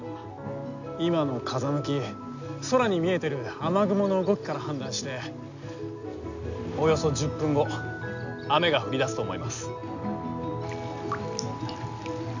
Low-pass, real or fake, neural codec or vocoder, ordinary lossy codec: 7.2 kHz; real; none; none